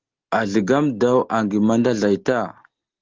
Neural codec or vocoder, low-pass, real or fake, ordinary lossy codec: none; 7.2 kHz; real; Opus, 16 kbps